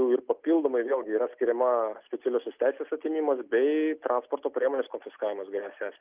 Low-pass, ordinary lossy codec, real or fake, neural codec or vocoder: 3.6 kHz; Opus, 24 kbps; real; none